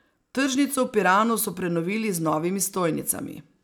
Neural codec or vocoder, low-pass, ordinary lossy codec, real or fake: none; none; none; real